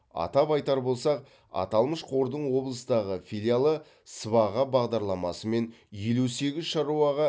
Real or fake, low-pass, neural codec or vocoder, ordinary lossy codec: real; none; none; none